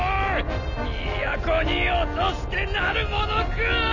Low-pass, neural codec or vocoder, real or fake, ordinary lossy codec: 7.2 kHz; none; real; none